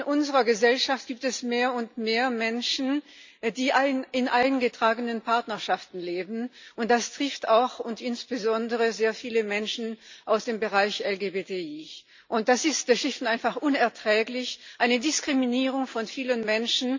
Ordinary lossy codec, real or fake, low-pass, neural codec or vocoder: MP3, 64 kbps; real; 7.2 kHz; none